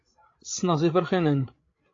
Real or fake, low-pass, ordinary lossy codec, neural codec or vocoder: fake; 7.2 kHz; AAC, 32 kbps; codec, 16 kHz, 16 kbps, FreqCodec, larger model